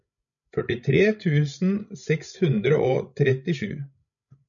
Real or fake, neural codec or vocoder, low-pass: fake; codec, 16 kHz, 16 kbps, FreqCodec, larger model; 7.2 kHz